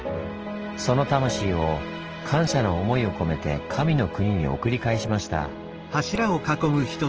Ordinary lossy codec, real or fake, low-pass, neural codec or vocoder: Opus, 16 kbps; real; 7.2 kHz; none